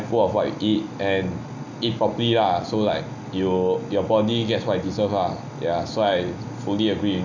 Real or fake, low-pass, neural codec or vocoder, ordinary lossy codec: real; 7.2 kHz; none; none